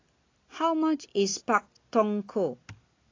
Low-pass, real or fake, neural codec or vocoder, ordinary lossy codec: 7.2 kHz; real; none; AAC, 32 kbps